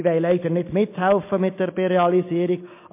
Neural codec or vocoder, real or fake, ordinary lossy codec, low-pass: none; real; MP3, 24 kbps; 3.6 kHz